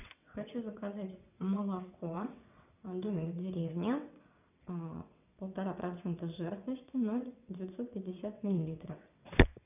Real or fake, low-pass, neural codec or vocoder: fake; 3.6 kHz; vocoder, 22.05 kHz, 80 mel bands, WaveNeXt